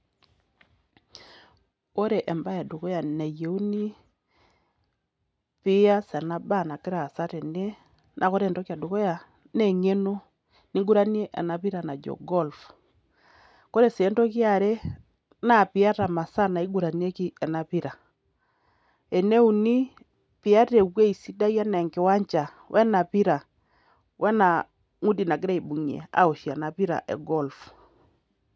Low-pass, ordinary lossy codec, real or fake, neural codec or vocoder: none; none; real; none